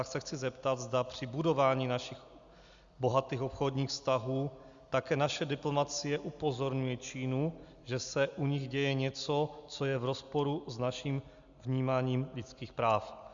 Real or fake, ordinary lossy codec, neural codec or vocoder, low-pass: real; Opus, 64 kbps; none; 7.2 kHz